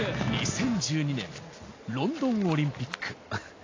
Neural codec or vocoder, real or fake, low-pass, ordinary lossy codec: none; real; 7.2 kHz; AAC, 32 kbps